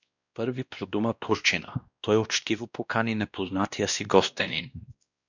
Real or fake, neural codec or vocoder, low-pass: fake; codec, 16 kHz, 1 kbps, X-Codec, WavLM features, trained on Multilingual LibriSpeech; 7.2 kHz